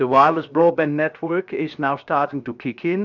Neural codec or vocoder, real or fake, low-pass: codec, 16 kHz, 0.7 kbps, FocalCodec; fake; 7.2 kHz